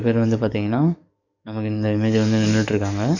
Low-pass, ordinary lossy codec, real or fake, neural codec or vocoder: 7.2 kHz; none; fake; codec, 44.1 kHz, 7.8 kbps, DAC